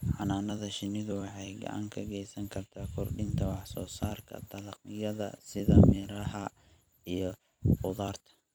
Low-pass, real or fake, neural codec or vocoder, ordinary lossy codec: none; fake; vocoder, 44.1 kHz, 128 mel bands every 256 samples, BigVGAN v2; none